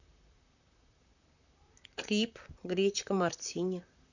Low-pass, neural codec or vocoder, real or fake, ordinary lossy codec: 7.2 kHz; vocoder, 44.1 kHz, 128 mel bands, Pupu-Vocoder; fake; none